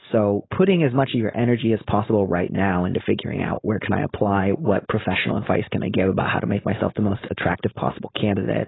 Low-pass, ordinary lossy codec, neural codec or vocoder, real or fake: 7.2 kHz; AAC, 16 kbps; codec, 16 kHz, 4.8 kbps, FACodec; fake